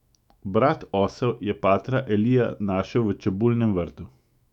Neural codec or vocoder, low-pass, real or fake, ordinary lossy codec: autoencoder, 48 kHz, 128 numbers a frame, DAC-VAE, trained on Japanese speech; 19.8 kHz; fake; none